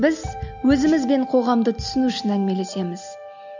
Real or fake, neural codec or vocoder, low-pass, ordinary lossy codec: real; none; 7.2 kHz; none